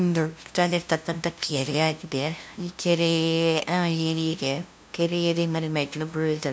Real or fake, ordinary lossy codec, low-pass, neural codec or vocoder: fake; none; none; codec, 16 kHz, 0.5 kbps, FunCodec, trained on LibriTTS, 25 frames a second